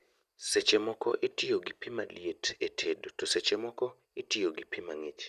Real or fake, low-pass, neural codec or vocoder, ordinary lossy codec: real; 14.4 kHz; none; none